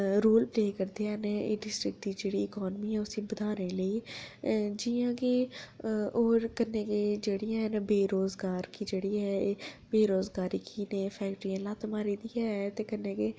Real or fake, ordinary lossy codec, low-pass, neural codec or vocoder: real; none; none; none